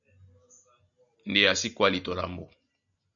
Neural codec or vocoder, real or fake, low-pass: none; real; 7.2 kHz